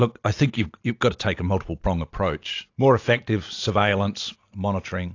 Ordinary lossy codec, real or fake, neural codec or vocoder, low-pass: AAC, 48 kbps; real; none; 7.2 kHz